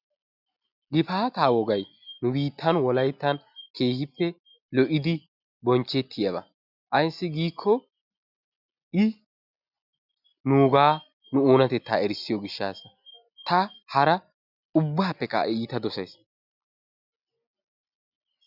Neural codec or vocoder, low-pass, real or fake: none; 5.4 kHz; real